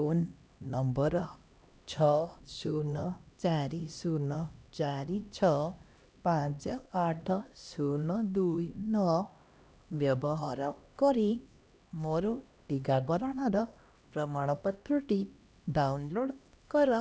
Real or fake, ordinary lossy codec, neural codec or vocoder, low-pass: fake; none; codec, 16 kHz, 1 kbps, X-Codec, HuBERT features, trained on LibriSpeech; none